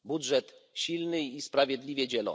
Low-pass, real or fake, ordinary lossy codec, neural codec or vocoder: none; real; none; none